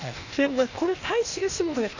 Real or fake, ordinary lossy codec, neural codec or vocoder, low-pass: fake; none; codec, 16 kHz, 0.8 kbps, ZipCodec; 7.2 kHz